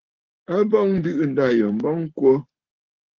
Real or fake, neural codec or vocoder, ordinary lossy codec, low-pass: fake; codec, 44.1 kHz, 7.8 kbps, Pupu-Codec; Opus, 16 kbps; 7.2 kHz